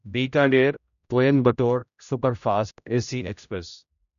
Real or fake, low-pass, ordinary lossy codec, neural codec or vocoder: fake; 7.2 kHz; none; codec, 16 kHz, 0.5 kbps, X-Codec, HuBERT features, trained on general audio